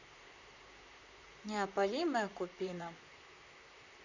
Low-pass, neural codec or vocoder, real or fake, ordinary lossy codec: 7.2 kHz; vocoder, 44.1 kHz, 128 mel bands, Pupu-Vocoder; fake; none